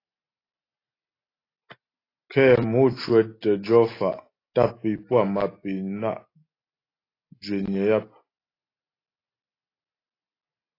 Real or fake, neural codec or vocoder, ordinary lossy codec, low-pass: real; none; AAC, 24 kbps; 5.4 kHz